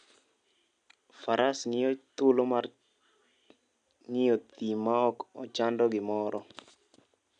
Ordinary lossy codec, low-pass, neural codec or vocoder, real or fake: none; 9.9 kHz; none; real